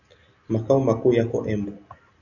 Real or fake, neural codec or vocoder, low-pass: real; none; 7.2 kHz